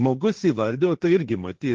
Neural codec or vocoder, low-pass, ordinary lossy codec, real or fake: codec, 16 kHz, 1.1 kbps, Voila-Tokenizer; 7.2 kHz; Opus, 16 kbps; fake